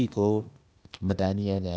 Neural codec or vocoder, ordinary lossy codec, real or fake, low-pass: codec, 16 kHz, 0.8 kbps, ZipCodec; none; fake; none